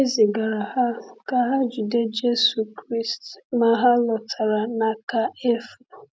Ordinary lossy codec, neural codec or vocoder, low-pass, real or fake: none; none; none; real